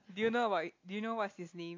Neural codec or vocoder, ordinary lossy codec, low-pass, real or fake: none; AAC, 48 kbps; 7.2 kHz; real